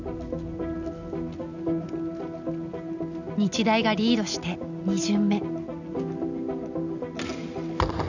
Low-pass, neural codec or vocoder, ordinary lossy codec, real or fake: 7.2 kHz; none; none; real